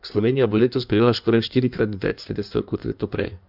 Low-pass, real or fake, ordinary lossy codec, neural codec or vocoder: 5.4 kHz; fake; none; codec, 16 kHz in and 24 kHz out, 1.1 kbps, FireRedTTS-2 codec